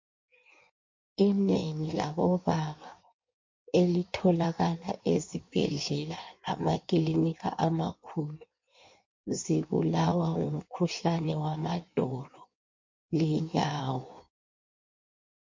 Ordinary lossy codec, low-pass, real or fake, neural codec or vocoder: MP3, 48 kbps; 7.2 kHz; fake; codec, 16 kHz in and 24 kHz out, 1.1 kbps, FireRedTTS-2 codec